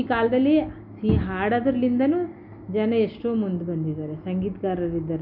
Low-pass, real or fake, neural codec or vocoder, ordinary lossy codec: 5.4 kHz; real; none; MP3, 48 kbps